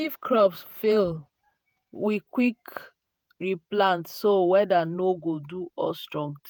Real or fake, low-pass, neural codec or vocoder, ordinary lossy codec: fake; none; vocoder, 48 kHz, 128 mel bands, Vocos; none